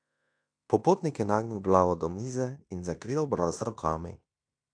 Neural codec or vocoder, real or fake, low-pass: codec, 16 kHz in and 24 kHz out, 0.9 kbps, LongCat-Audio-Codec, fine tuned four codebook decoder; fake; 9.9 kHz